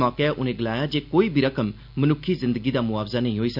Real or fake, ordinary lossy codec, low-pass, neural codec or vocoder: real; none; 5.4 kHz; none